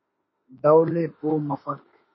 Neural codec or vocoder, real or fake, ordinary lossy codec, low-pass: autoencoder, 48 kHz, 32 numbers a frame, DAC-VAE, trained on Japanese speech; fake; MP3, 24 kbps; 7.2 kHz